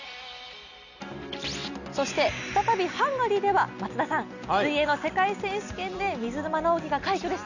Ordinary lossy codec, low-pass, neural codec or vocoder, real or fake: none; 7.2 kHz; none; real